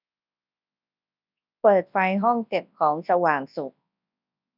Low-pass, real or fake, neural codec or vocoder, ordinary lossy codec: 5.4 kHz; fake; codec, 24 kHz, 0.9 kbps, WavTokenizer, large speech release; none